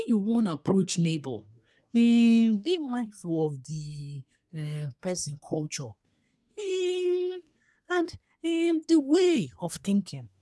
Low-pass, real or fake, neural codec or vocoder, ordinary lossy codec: none; fake; codec, 24 kHz, 1 kbps, SNAC; none